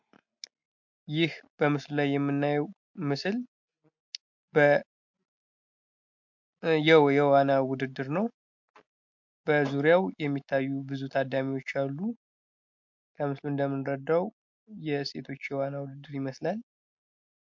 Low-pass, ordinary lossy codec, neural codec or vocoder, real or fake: 7.2 kHz; MP3, 48 kbps; none; real